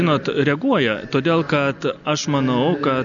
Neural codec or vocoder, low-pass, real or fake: none; 7.2 kHz; real